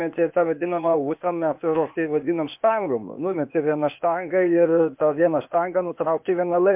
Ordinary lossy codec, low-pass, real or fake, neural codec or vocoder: MP3, 32 kbps; 3.6 kHz; fake; codec, 16 kHz, 0.8 kbps, ZipCodec